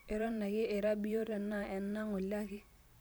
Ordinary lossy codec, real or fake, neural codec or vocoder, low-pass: none; fake; vocoder, 44.1 kHz, 128 mel bands every 512 samples, BigVGAN v2; none